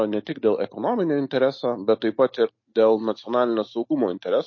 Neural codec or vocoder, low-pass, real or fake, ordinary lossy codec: none; 7.2 kHz; real; MP3, 32 kbps